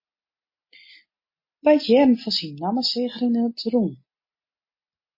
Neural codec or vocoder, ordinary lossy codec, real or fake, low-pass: none; MP3, 24 kbps; real; 5.4 kHz